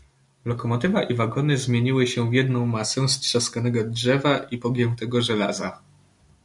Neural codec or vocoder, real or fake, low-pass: none; real; 10.8 kHz